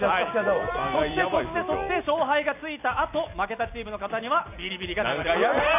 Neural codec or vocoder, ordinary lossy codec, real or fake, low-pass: none; none; real; 3.6 kHz